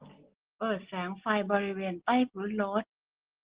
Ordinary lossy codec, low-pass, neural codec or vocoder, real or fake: Opus, 16 kbps; 3.6 kHz; none; real